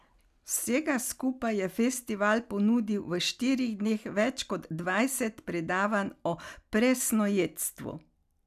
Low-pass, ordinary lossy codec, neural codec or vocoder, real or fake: 14.4 kHz; none; none; real